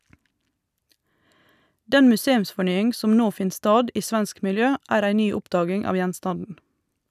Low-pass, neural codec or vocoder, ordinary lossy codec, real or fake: 14.4 kHz; none; none; real